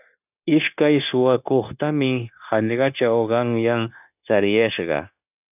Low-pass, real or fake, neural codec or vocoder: 3.6 kHz; fake; codec, 16 kHz, 0.9 kbps, LongCat-Audio-Codec